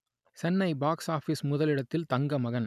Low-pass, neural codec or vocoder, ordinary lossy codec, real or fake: 14.4 kHz; none; none; real